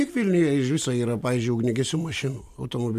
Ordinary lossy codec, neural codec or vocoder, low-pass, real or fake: AAC, 96 kbps; none; 14.4 kHz; real